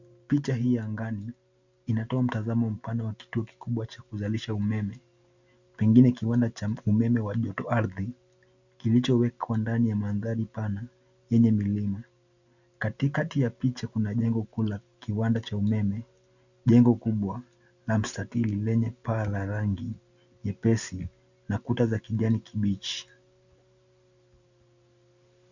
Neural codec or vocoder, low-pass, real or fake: none; 7.2 kHz; real